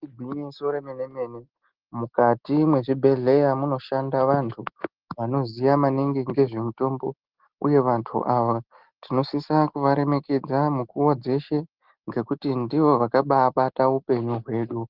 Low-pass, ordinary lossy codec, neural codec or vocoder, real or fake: 5.4 kHz; Opus, 16 kbps; none; real